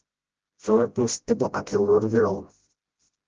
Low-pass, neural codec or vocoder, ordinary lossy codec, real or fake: 7.2 kHz; codec, 16 kHz, 0.5 kbps, FreqCodec, smaller model; Opus, 16 kbps; fake